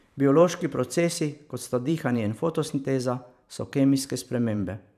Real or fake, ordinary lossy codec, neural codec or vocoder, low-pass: fake; none; vocoder, 44.1 kHz, 128 mel bands every 512 samples, BigVGAN v2; 14.4 kHz